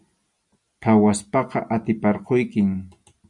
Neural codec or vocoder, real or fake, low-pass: none; real; 10.8 kHz